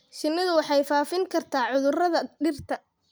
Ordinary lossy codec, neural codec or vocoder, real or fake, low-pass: none; none; real; none